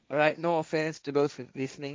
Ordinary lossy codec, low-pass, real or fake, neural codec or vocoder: none; none; fake; codec, 16 kHz, 1.1 kbps, Voila-Tokenizer